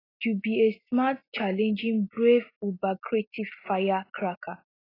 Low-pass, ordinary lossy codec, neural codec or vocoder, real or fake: 5.4 kHz; AAC, 24 kbps; none; real